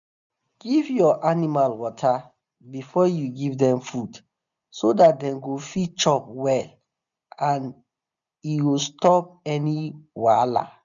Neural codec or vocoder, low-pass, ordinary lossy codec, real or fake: none; 7.2 kHz; none; real